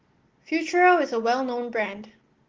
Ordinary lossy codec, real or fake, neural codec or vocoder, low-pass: Opus, 16 kbps; real; none; 7.2 kHz